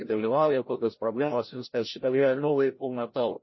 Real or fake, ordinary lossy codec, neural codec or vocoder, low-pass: fake; MP3, 24 kbps; codec, 16 kHz, 0.5 kbps, FreqCodec, larger model; 7.2 kHz